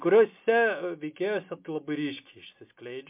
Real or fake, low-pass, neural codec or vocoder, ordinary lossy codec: real; 3.6 kHz; none; MP3, 32 kbps